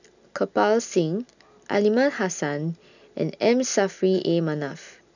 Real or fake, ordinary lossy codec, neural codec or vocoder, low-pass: real; none; none; 7.2 kHz